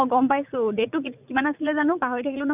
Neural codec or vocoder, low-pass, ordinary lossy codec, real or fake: vocoder, 44.1 kHz, 80 mel bands, Vocos; 3.6 kHz; none; fake